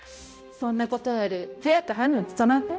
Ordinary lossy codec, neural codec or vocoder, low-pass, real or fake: none; codec, 16 kHz, 0.5 kbps, X-Codec, HuBERT features, trained on balanced general audio; none; fake